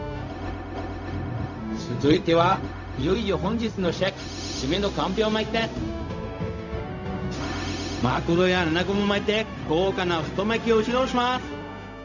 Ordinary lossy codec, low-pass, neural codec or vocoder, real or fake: none; 7.2 kHz; codec, 16 kHz, 0.4 kbps, LongCat-Audio-Codec; fake